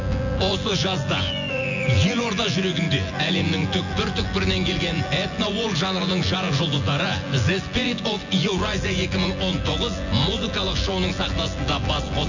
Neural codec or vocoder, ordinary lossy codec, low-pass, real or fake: vocoder, 24 kHz, 100 mel bands, Vocos; none; 7.2 kHz; fake